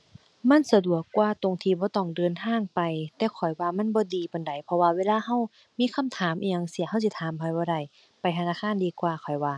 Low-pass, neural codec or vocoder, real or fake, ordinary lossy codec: none; none; real; none